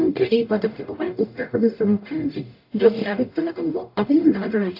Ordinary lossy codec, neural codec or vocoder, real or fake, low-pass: AAC, 32 kbps; codec, 44.1 kHz, 0.9 kbps, DAC; fake; 5.4 kHz